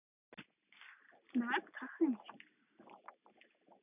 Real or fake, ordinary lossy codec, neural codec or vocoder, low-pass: real; none; none; 3.6 kHz